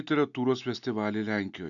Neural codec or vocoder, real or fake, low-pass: none; real; 7.2 kHz